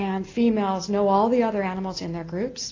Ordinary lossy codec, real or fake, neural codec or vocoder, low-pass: AAC, 32 kbps; real; none; 7.2 kHz